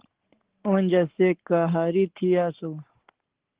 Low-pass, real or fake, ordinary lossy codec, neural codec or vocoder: 3.6 kHz; fake; Opus, 16 kbps; codec, 44.1 kHz, 7.8 kbps, Pupu-Codec